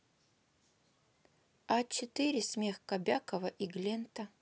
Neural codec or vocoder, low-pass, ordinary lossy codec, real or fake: none; none; none; real